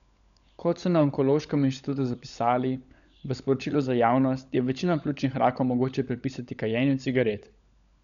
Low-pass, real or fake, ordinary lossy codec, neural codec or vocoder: 7.2 kHz; fake; none; codec, 16 kHz, 16 kbps, FunCodec, trained on LibriTTS, 50 frames a second